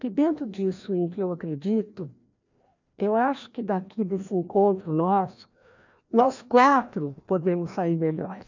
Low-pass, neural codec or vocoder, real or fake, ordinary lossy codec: 7.2 kHz; codec, 16 kHz, 1 kbps, FreqCodec, larger model; fake; none